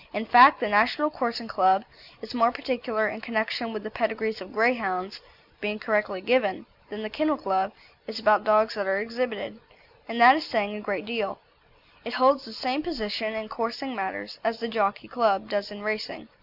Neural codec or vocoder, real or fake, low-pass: none; real; 5.4 kHz